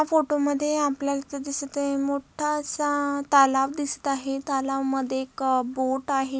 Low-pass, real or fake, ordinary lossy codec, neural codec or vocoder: none; real; none; none